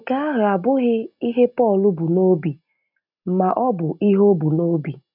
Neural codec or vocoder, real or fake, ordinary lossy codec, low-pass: none; real; none; 5.4 kHz